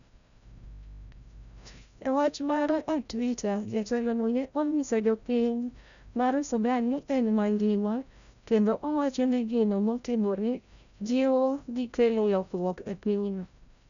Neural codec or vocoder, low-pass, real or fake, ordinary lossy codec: codec, 16 kHz, 0.5 kbps, FreqCodec, larger model; 7.2 kHz; fake; none